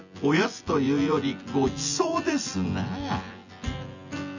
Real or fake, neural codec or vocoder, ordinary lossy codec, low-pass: fake; vocoder, 24 kHz, 100 mel bands, Vocos; none; 7.2 kHz